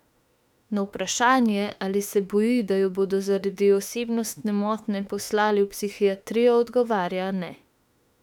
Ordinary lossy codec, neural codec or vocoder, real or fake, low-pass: none; autoencoder, 48 kHz, 32 numbers a frame, DAC-VAE, trained on Japanese speech; fake; 19.8 kHz